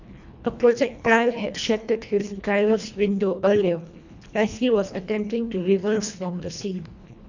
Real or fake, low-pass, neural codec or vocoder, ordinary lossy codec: fake; 7.2 kHz; codec, 24 kHz, 1.5 kbps, HILCodec; none